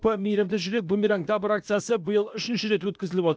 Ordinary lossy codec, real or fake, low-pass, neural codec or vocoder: none; fake; none; codec, 16 kHz, 0.8 kbps, ZipCodec